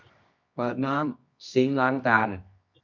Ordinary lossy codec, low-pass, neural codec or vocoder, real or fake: MP3, 64 kbps; 7.2 kHz; codec, 24 kHz, 0.9 kbps, WavTokenizer, medium music audio release; fake